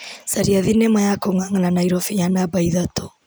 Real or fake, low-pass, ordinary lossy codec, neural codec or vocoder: real; none; none; none